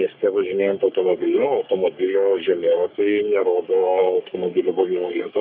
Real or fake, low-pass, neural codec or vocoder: fake; 5.4 kHz; codec, 44.1 kHz, 3.4 kbps, Pupu-Codec